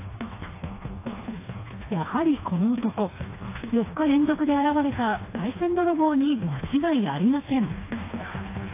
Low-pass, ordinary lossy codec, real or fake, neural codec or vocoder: 3.6 kHz; AAC, 24 kbps; fake; codec, 16 kHz, 2 kbps, FreqCodec, smaller model